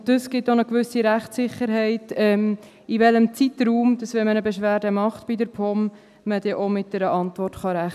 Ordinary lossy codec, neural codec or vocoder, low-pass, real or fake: none; none; 14.4 kHz; real